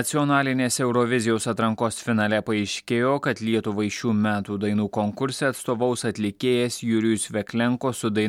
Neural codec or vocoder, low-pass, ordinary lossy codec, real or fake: none; 19.8 kHz; MP3, 96 kbps; real